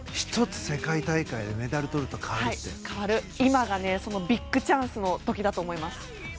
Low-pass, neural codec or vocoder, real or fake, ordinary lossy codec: none; none; real; none